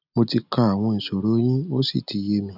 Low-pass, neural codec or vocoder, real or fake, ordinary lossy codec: 5.4 kHz; none; real; none